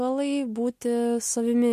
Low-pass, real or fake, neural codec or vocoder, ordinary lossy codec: 14.4 kHz; real; none; MP3, 64 kbps